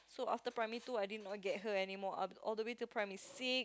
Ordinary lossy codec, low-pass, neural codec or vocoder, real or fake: none; none; none; real